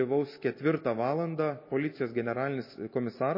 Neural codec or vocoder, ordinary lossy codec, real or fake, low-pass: none; MP3, 24 kbps; real; 5.4 kHz